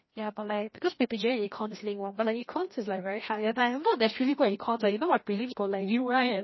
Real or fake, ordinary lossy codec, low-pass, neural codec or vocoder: fake; MP3, 24 kbps; 7.2 kHz; codec, 16 kHz, 1 kbps, FreqCodec, larger model